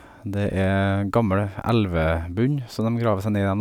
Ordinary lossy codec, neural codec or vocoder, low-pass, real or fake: none; none; 19.8 kHz; real